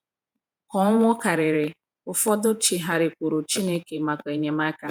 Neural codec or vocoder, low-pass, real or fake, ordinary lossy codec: vocoder, 48 kHz, 128 mel bands, Vocos; none; fake; none